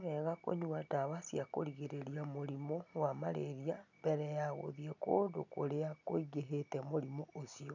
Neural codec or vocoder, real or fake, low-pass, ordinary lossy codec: none; real; 7.2 kHz; none